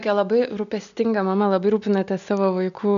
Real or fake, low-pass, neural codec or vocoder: real; 7.2 kHz; none